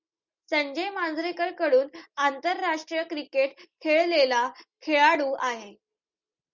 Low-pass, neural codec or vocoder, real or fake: 7.2 kHz; none; real